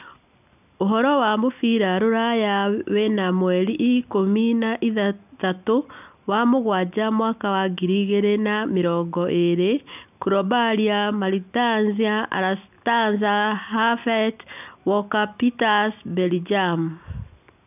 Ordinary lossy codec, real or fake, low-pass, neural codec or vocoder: none; real; 3.6 kHz; none